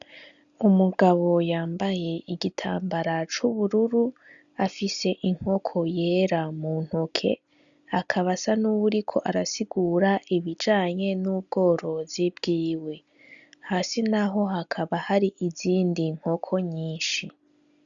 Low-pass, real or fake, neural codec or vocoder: 7.2 kHz; real; none